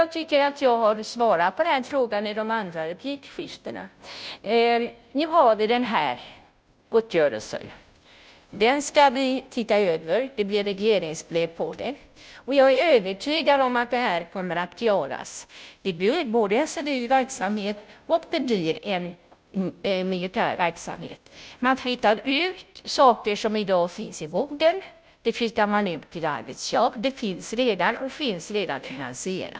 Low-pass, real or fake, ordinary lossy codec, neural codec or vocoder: none; fake; none; codec, 16 kHz, 0.5 kbps, FunCodec, trained on Chinese and English, 25 frames a second